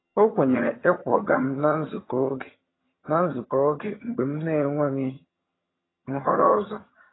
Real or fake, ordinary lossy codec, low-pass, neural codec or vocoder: fake; AAC, 16 kbps; 7.2 kHz; vocoder, 22.05 kHz, 80 mel bands, HiFi-GAN